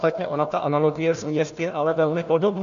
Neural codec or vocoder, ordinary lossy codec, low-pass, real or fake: codec, 16 kHz, 1 kbps, FunCodec, trained on Chinese and English, 50 frames a second; MP3, 96 kbps; 7.2 kHz; fake